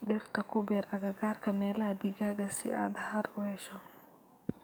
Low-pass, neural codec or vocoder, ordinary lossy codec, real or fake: none; codec, 44.1 kHz, 7.8 kbps, DAC; none; fake